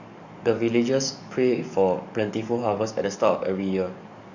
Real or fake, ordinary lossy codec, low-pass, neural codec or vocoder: fake; none; 7.2 kHz; codec, 44.1 kHz, 7.8 kbps, DAC